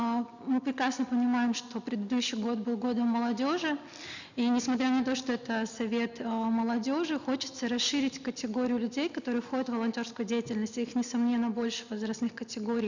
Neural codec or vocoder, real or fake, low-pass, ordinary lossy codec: none; real; 7.2 kHz; none